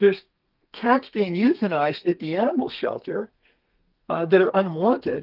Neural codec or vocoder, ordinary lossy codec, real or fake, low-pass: codec, 32 kHz, 1.9 kbps, SNAC; Opus, 24 kbps; fake; 5.4 kHz